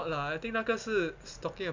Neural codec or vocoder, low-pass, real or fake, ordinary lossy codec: none; 7.2 kHz; real; MP3, 64 kbps